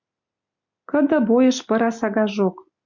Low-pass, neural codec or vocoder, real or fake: 7.2 kHz; none; real